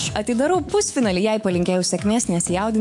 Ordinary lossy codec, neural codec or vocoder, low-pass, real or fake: MP3, 64 kbps; autoencoder, 48 kHz, 128 numbers a frame, DAC-VAE, trained on Japanese speech; 10.8 kHz; fake